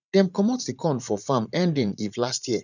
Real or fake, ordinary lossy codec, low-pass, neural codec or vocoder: fake; none; 7.2 kHz; vocoder, 44.1 kHz, 80 mel bands, Vocos